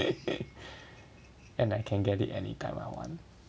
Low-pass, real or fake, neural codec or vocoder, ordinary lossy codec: none; real; none; none